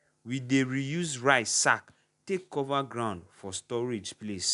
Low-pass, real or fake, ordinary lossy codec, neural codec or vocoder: 10.8 kHz; real; none; none